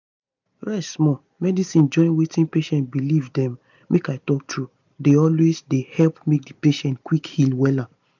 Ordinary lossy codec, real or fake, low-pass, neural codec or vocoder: none; real; 7.2 kHz; none